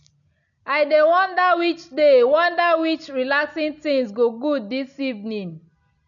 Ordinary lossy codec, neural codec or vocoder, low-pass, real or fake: none; none; 7.2 kHz; real